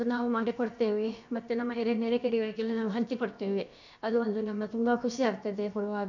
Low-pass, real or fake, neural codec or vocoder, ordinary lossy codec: 7.2 kHz; fake; codec, 16 kHz, about 1 kbps, DyCAST, with the encoder's durations; none